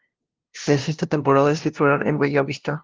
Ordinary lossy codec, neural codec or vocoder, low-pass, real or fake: Opus, 16 kbps; codec, 16 kHz, 0.5 kbps, FunCodec, trained on LibriTTS, 25 frames a second; 7.2 kHz; fake